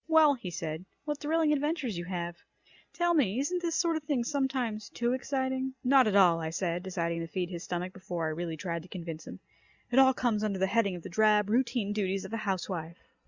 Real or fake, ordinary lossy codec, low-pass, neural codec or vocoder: real; Opus, 64 kbps; 7.2 kHz; none